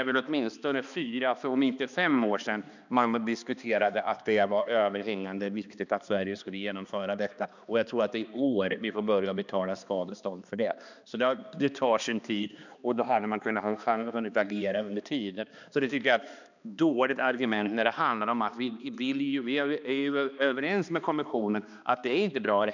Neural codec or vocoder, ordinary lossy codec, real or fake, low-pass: codec, 16 kHz, 2 kbps, X-Codec, HuBERT features, trained on balanced general audio; none; fake; 7.2 kHz